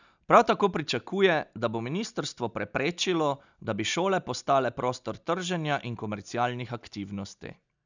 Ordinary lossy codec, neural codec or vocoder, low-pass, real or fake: none; none; 7.2 kHz; real